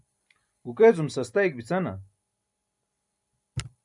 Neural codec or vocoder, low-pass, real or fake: none; 10.8 kHz; real